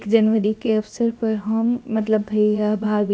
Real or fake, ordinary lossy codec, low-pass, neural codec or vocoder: fake; none; none; codec, 16 kHz, 0.7 kbps, FocalCodec